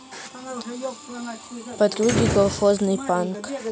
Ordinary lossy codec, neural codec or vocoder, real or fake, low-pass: none; none; real; none